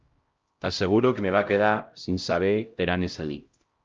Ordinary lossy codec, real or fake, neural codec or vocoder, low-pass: Opus, 24 kbps; fake; codec, 16 kHz, 0.5 kbps, X-Codec, HuBERT features, trained on LibriSpeech; 7.2 kHz